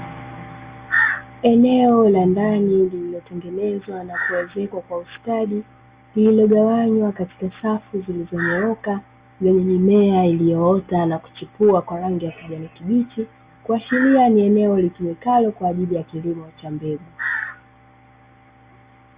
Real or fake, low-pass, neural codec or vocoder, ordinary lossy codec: real; 3.6 kHz; none; Opus, 64 kbps